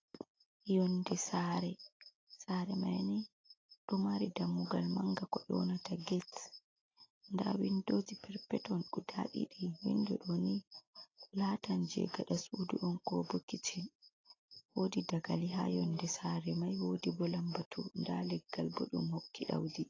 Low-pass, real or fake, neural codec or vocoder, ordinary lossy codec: 7.2 kHz; real; none; AAC, 32 kbps